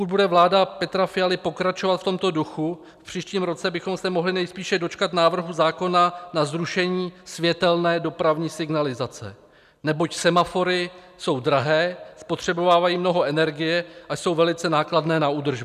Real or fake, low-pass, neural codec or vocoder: real; 14.4 kHz; none